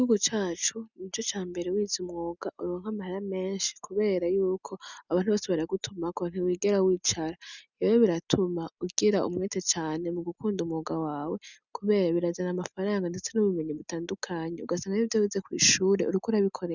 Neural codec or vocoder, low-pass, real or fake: none; 7.2 kHz; real